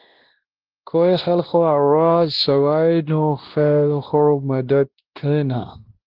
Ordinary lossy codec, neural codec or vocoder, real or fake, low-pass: Opus, 16 kbps; codec, 16 kHz, 1 kbps, X-Codec, WavLM features, trained on Multilingual LibriSpeech; fake; 5.4 kHz